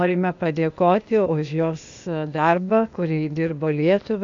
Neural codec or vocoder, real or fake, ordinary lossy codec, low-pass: codec, 16 kHz, 0.8 kbps, ZipCodec; fake; AAC, 64 kbps; 7.2 kHz